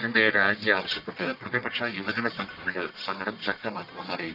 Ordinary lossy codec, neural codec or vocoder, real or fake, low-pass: none; codec, 44.1 kHz, 1.7 kbps, Pupu-Codec; fake; 5.4 kHz